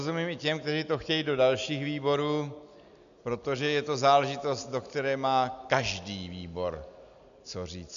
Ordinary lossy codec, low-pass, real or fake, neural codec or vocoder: MP3, 96 kbps; 7.2 kHz; real; none